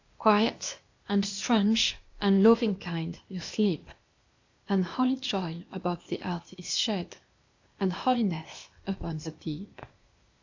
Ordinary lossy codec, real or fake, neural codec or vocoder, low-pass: AAC, 48 kbps; fake; codec, 16 kHz, 0.8 kbps, ZipCodec; 7.2 kHz